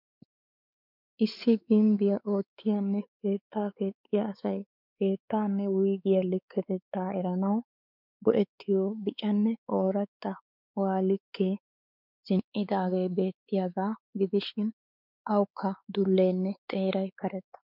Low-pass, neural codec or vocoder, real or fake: 5.4 kHz; codec, 16 kHz, 4 kbps, X-Codec, WavLM features, trained on Multilingual LibriSpeech; fake